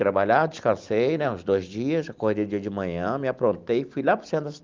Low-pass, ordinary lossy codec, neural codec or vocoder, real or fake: 7.2 kHz; Opus, 32 kbps; none; real